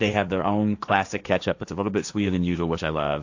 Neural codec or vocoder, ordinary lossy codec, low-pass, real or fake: codec, 16 kHz, 1.1 kbps, Voila-Tokenizer; AAC, 48 kbps; 7.2 kHz; fake